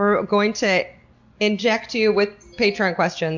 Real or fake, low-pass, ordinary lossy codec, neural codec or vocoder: real; 7.2 kHz; MP3, 48 kbps; none